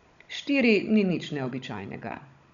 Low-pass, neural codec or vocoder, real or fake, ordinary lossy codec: 7.2 kHz; codec, 16 kHz, 16 kbps, FunCodec, trained on Chinese and English, 50 frames a second; fake; none